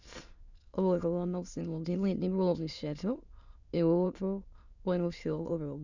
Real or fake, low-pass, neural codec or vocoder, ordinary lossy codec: fake; 7.2 kHz; autoencoder, 22.05 kHz, a latent of 192 numbers a frame, VITS, trained on many speakers; none